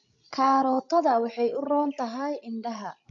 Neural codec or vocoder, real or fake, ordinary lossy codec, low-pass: codec, 16 kHz, 16 kbps, FreqCodec, larger model; fake; AAC, 64 kbps; 7.2 kHz